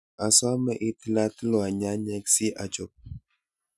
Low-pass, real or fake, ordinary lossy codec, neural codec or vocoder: none; real; none; none